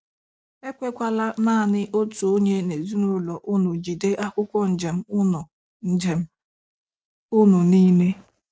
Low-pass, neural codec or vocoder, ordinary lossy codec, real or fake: none; none; none; real